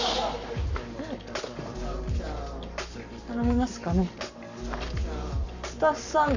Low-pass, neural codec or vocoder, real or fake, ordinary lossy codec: 7.2 kHz; codec, 44.1 kHz, 7.8 kbps, Pupu-Codec; fake; none